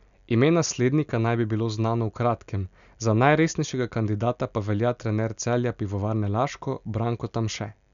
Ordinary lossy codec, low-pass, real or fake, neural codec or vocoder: none; 7.2 kHz; real; none